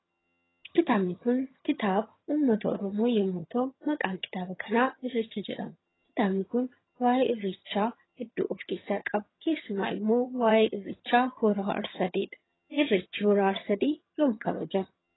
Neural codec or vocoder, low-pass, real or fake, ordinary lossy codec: vocoder, 22.05 kHz, 80 mel bands, HiFi-GAN; 7.2 kHz; fake; AAC, 16 kbps